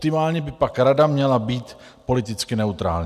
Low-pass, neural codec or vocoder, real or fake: 14.4 kHz; none; real